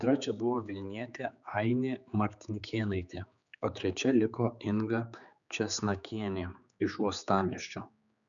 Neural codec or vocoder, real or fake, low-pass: codec, 16 kHz, 4 kbps, X-Codec, HuBERT features, trained on general audio; fake; 7.2 kHz